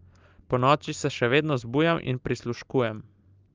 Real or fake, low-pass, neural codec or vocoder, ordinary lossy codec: real; 7.2 kHz; none; Opus, 24 kbps